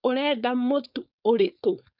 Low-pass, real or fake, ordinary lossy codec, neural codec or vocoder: 5.4 kHz; fake; none; codec, 16 kHz, 4.8 kbps, FACodec